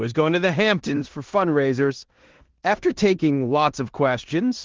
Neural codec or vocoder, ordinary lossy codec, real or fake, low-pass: codec, 16 kHz in and 24 kHz out, 0.9 kbps, LongCat-Audio-Codec, four codebook decoder; Opus, 16 kbps; fake; 7.2 kHz